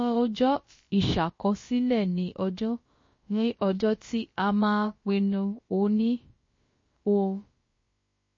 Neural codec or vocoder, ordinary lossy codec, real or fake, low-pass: codec, 16 kHz, about 1 kbps, DyCAST, with the encoder's durations; MP3, 32 kbps; fake; 7.2 kHz